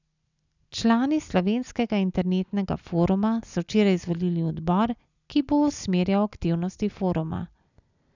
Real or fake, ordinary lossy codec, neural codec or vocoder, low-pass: real; none; none; 7.2 kHz